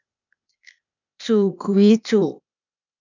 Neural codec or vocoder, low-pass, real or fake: codec, 16 kHz, 0.8 kbps, ZipCodec; 7.2 kHz; fake